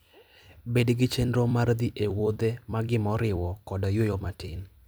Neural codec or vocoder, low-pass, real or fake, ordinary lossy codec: vocoder, 44.1 kHz, 128 mel bands every 256 samples, BigVGAN v2; none; fake; none